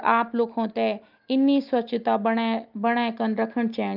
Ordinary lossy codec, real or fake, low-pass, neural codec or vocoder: Opus, 24 kbps; real; 5.4 kHz; none